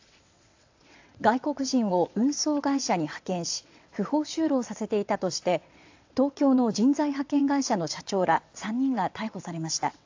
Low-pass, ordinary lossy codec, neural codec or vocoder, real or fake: 7.2 kHz; AAC, 48 kbps; vocoder, 22.05 kHz, 80 mel bands, WaveNeXt; fake